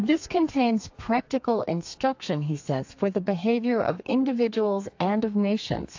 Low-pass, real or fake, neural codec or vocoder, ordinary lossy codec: 7.2 kHz; fake; codec, 32 kHz, 1.9 kbps, SNAC; AAC, 48 kbps